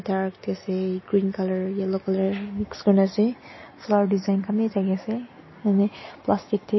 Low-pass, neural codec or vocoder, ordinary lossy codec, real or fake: 7.2 kHz; none; MP3, 24 kbps; real